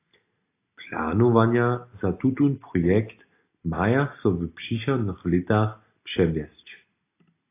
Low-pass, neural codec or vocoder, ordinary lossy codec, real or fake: 3.6 kHz; none; AAC, 24 kbps; real